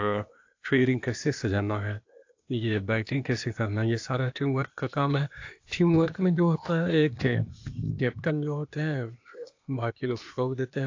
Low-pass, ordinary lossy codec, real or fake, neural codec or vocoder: 7.2 kHz; AAC, 48 kbps; fake; codec, 16 kHz, 0.8 kbps, ZipCodec